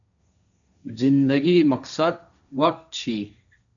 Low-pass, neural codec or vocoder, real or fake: 7.2 kHz; codec, 16 kHz, 1.1 kbps, Voila-Tokenizer; fake